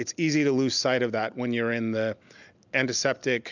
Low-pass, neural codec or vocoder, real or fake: 7.2 kHz; none; real